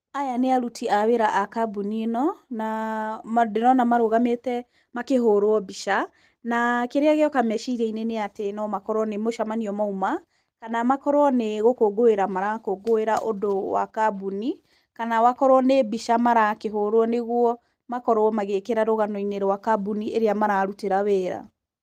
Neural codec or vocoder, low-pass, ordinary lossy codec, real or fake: none; 14.4 kHz; Opus, 24 kbps; real